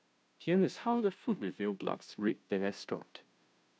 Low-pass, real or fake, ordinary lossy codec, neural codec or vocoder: none; fake; none; codec, 16 kHz, 0.5 kbps, FunCodec, trained on Chinese and English, 25 frames a second